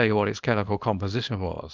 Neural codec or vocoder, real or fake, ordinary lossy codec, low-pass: codec, 24 kHz, 0.9 kbps, WavTokenizer, small release; fake; Opus, 24 kbps; 7.2 kHz